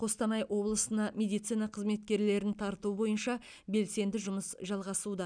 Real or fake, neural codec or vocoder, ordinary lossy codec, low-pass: real; none; none; 9.9 kHz